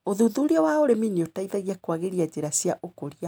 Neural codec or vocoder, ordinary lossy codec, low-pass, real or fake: none; none; none; real